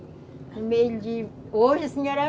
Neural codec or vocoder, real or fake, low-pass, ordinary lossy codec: none; real; none; none